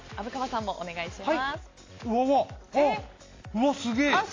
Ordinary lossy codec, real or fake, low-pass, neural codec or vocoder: AAC, 32 kbps; real; 7.2 kHz; none